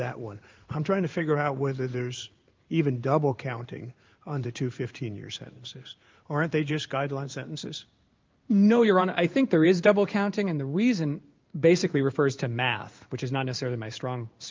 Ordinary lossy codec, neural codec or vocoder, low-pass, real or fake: Opus, 24 kbps; codec, 16 kHz in and 24 kHz out, 1 kbps, XY-Tokenizer; 7.2 kHz; fake